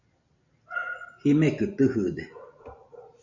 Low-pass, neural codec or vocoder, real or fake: 7.2 kHz; none; real